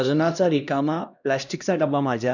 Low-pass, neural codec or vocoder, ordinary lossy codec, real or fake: 7.2 kHz; codec, 16 kHz, 1 kbps, X-Codec, HuBERT features, trained on LibriSpeech; none; fake